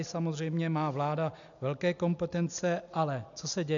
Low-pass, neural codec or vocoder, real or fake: 7.2 kHz; none; real